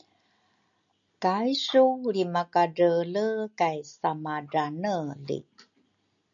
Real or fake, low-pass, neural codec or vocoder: real; 7.2 kHz; none